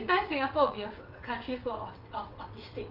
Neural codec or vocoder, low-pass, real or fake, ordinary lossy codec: vocoder, 22.05 kHz, 80 mel bands, WaveNeXt; 5.4 kHz; fake; Opus, 24 kbps